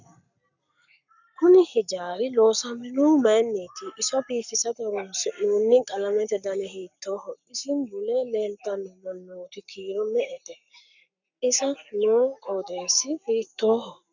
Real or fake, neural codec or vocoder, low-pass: fake; codec, 44.1 kHz, 7.8 kbps, Pupu-Codec; 7.2 kHz